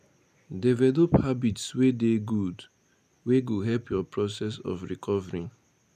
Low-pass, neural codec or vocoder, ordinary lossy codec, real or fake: 14.4 kHz; none; none; real